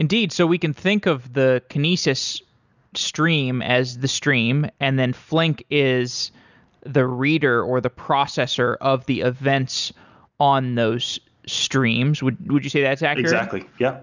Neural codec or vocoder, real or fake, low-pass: none; real; 7.2 kHz